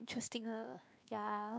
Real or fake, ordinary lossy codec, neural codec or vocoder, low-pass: fake; none; codec, 16 kHz, 2 kbps, X-Codec, WavLM features, trained on Multilingual LibriSpeech; none